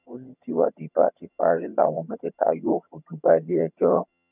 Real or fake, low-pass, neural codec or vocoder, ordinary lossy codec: fake; 3.6 kHz; vocoder, 22.05 kHz, 80 mel bands, HiFi-GAN; none